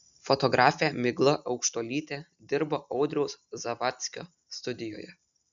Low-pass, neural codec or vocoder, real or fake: 7.2 kHz; none; real